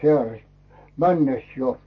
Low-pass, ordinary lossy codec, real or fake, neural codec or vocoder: 7.2 kHz; MP3, 32 kbps; real; none